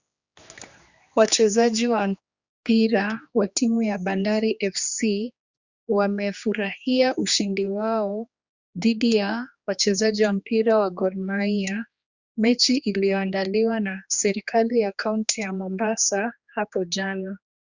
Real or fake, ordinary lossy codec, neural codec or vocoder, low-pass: fake; Opus, 64 kbps; codec, 16 kHz, 2 kbps, X-Codec, HuBERT features, trained on general audio; 7.2 kHz